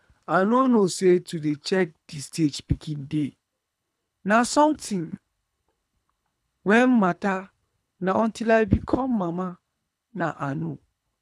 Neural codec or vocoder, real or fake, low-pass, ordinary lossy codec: codec, 24 kHz, 3 kbps, HILCodec; fake; none; none